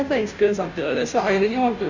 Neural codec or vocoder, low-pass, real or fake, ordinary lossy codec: codec, 16 kHz, 0.5 kbps, FunCodec, trained on Chinese and English, 25 frames a second; 7.2 kHz; fake; none